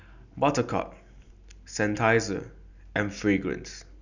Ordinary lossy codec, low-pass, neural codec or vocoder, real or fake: none; 7.2 kHz; none; real